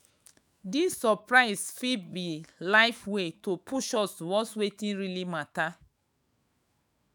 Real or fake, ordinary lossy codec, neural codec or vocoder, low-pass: fake; none; autoencoder, 48 kHz, 128 numbers a frame, DAC-VAE, trained on Japanese speech; none